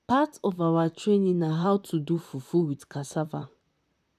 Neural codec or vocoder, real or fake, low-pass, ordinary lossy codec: vocoder, 44.1 kHz, 128 mel bands, Pupu-Vocoder; fake; 14.4 kHz; none